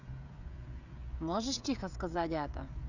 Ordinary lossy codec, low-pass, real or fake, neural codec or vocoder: none; 7.2 kHz; fake; codec, 16 kHz, 16 kbps, FreqCodec, smaller model